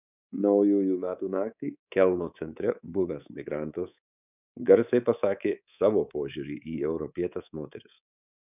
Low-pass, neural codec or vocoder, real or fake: 3.6 kHz; codec, 24 kHz, 3.1 kbps, DualCodec; fake